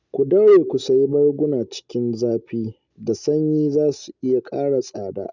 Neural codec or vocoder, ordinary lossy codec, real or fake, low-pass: none; none; real; 7.2 kHz